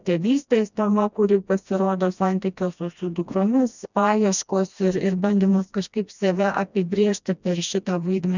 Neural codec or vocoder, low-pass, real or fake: codec, 16 kHz, 1 kbps, FreqCodec, smaller model; 7.2 kHz; fake